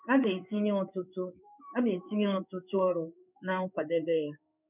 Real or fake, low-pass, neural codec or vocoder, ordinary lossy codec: fake; 3.6 kHz; codec, 16 kHz in and 24 kHz out, 1 kbps, XY-Tokenizer; none